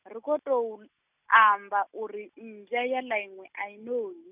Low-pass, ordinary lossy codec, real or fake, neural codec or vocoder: 3.6 kHz; none; real; none